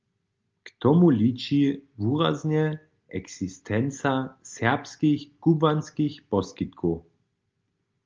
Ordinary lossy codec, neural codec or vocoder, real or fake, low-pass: Opus, 24 kbps; none; real; 7.2 kHz